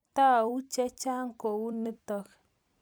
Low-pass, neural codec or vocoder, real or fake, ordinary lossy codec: none; vocoder, 44.1 kHz, 128 mel bands every 256 samples, BigVGAN v2; fake; none